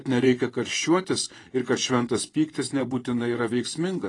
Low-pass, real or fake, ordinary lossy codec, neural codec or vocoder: 10.8 kHz; fake; AAC, 32 kbps; vocoder, 24 kHz, 100 mel bands, Vocos